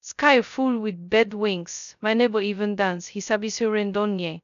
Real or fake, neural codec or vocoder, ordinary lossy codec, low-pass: fake; codec, 16 kHz, 0.2 kbps, FocalCodec; MP3, 96 kbps; 7.2 kHz